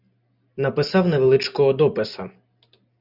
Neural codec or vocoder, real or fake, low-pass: none; real; 5.4 kHz